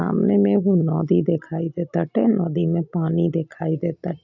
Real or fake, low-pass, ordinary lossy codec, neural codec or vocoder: real; 7.2 kHz; none; none